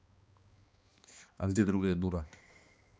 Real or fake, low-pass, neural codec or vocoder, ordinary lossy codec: fake; none; codec, 16 kHz, 2 kbps, X-Codec, HuBERT features, trained on balanced general audio; none